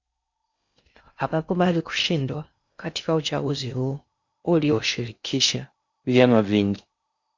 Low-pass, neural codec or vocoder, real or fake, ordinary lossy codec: 7.2 kHz; codec, 16 kHz in and 24 kHz out, 0.6 kbps, FocalCodec, streaming, 4096 codes; fake; Opus, 64 kbps